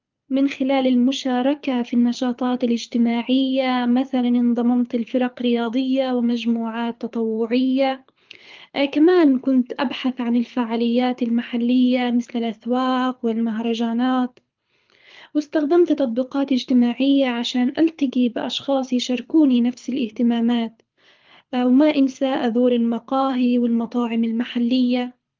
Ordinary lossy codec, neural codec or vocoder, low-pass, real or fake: Opus, 32 kbps; codec, 24 kHz, 6 kbps, HILCodec; 7.2 kHz; fake